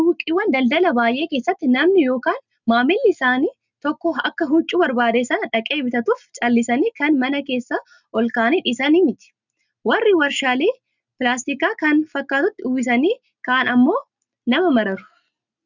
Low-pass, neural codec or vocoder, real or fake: 7.2 kHz; none; real